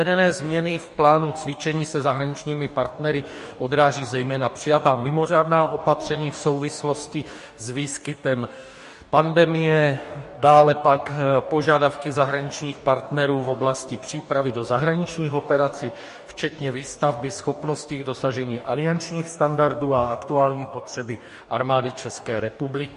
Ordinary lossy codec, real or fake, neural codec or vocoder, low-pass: MP3, 48 kbps; fake; codec, 44.1 kHz, 2.6 kbps, DAC; 14.4 kHz